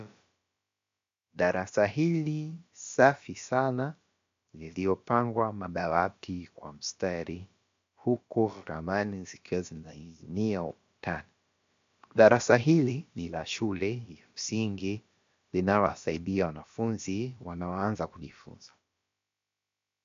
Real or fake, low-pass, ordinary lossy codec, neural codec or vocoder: fake; 7.2 kHz; MP3, 48 kbps; codec, 16 kHz, about 1 kbps, DyCAST, with the encoder's durations